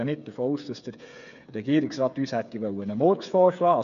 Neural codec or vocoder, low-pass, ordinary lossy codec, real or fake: codec, 16 kHz, 8 kbps, FreqCodec, smaller model; 7.2 kHz; none; fake